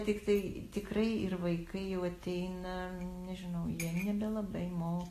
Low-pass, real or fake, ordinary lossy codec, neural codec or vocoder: 14.4 kHz; real; AAC, 48 kbps; none